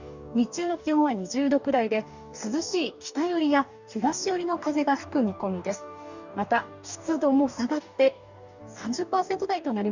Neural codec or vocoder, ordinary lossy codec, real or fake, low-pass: codec, 44.1 kHz, 2.6 kbps, DAC; none; fake; 7.2 kHz